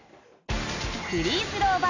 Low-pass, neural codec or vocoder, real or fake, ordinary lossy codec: 7.2 kHz; none; real; none